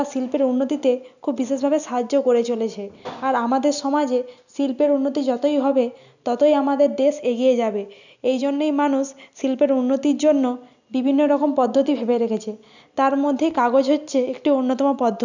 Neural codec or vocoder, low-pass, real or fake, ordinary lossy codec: none; 7.2 kHz; real; none